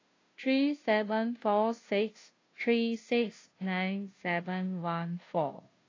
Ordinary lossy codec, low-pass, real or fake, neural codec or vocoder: AAC, 32 kbps; 7.2 kHz; fake; codec, 16 kHz, 0.5 kbps, FunCodec, trained on Chinese and English, 25 frames a second